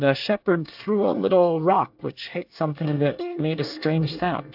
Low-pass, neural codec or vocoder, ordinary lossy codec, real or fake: 5.4 kHz; codec, 24 kHz, 1 kbps, SNAC; AAC, 48 kbps; fake